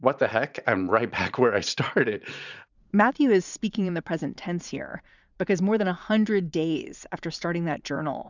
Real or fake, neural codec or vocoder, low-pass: fake; vocoder, 44.1 kHz, 80 mel bands, Vocos; 7.2 kHz